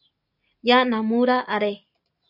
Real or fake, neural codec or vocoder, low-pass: real; none; 5.4 kHz